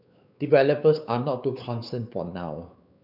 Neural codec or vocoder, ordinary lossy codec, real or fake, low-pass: codec, 16 kHz, 4 kbps, X-Codec, WavLM features, trained on Multilingual LibriSpeech; none; fake; 5.4 kHz